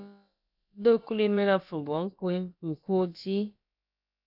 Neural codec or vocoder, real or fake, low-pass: codec, 16 kHz, about 1 kbps, DyCAST, with the encoder's durations; fake; 5.4 kHz